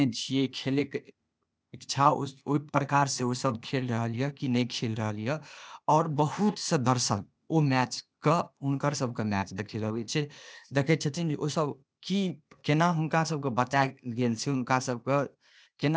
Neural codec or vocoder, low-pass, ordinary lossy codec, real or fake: codec, 16 kHz, 0.8 kbps, ZipCodec; none; none; fake